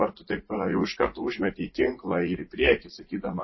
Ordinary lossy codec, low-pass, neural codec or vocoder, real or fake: MP3, 24 kbps; 7.2 kHz; vocoder, 44.1 kHz, 80 mel bands, Vocos; fake